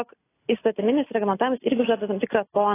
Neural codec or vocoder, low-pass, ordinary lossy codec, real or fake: none; 3.6 kHz; AAC, 16 kbps; real